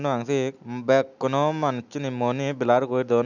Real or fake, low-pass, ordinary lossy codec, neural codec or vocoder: real; 7.2 kHz; none; none